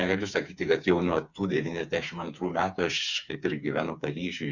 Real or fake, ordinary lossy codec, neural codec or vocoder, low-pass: fake; Opus, 64 kbps; codec, 16 kHz, 4 kbps, FreqCodec, smaller model; 7.2 kHz